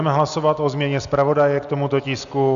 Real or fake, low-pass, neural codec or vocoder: real; 7.2 kHz; none